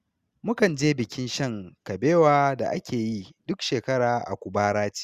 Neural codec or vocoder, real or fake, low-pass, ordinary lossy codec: none; real; 14.4 kHz; Opus, 64 kbps